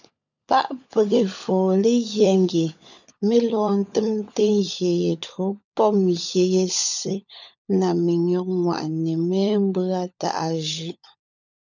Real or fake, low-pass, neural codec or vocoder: fake; 7.2 kHz; codec, 16 kHz, 16 kbps, FunCodec, trained on LibriTTS, 50 frames a second